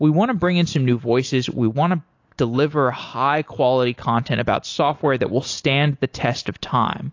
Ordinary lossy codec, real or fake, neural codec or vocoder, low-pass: AAC, 48 kbps; real; none; 7.2 kHz